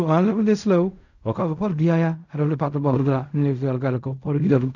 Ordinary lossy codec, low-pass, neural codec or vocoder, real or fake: none; 7.2 kHz; codec, 16 kHz in and 24 kHz out, 0.4 kbps, LongCat-Audio-Codec, fine tuned four codebook decoder; fake